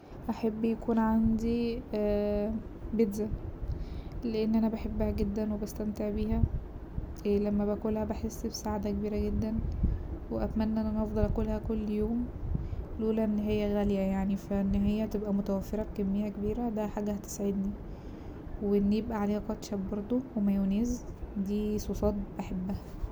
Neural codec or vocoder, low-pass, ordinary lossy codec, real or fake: none; none; none; real